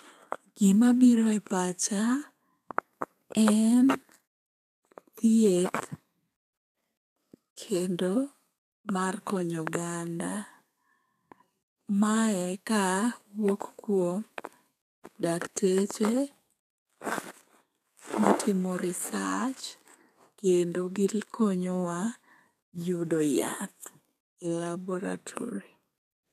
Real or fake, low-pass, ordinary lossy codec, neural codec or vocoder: fake; 14.4 kHz; none; codec, 32 kHz, 1.9 kbps, SNAC